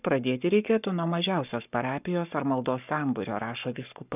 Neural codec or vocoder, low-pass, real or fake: codec, 44.1 kHz, 7.8 kbps, Pupu-Codec; 3.6 kHz; fake